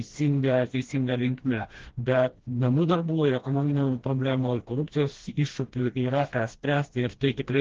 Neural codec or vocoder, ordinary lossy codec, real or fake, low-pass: codec, 16 kHz, 1 kbps, FreqCodec, smaller model; Opus, 16 kbps; fake; 7.2 kHz